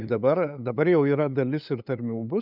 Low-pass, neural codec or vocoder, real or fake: 5.4 kHz; codec, 16 kHz, 8 kbps, FunCodec, trained on LibriTTS, 25 frames a second; fake